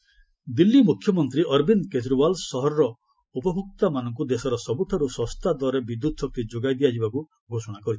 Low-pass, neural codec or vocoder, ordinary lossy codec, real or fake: none; none; none; real